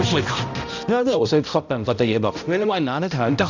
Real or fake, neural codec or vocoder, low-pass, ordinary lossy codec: fake; codec, 16 kHz, 0.5 kbps, X-Codec, HuBERT features, trained on balanced general audio; 7.2 kHz; none